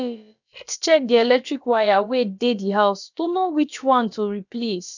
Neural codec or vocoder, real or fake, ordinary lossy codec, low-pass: codec, 16 kHz, about 1 kbps, DyCAST, with the encoder's durations; fake; none; 7.2 kHz